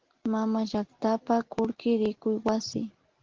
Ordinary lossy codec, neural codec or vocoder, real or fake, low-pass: Opus, 16 kbps; none; real; 7.2 kHz